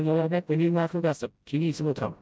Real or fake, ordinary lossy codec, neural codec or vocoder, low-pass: fake; none; codec, 16 kHz, 0.5 kbps, FreqCodec, smaller model; none